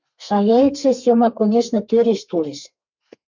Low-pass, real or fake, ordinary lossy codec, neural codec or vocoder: 7.2 kHz; fake; MP3, 64 kbps; codec, 32 kHz, 1.9 kbps, SNAC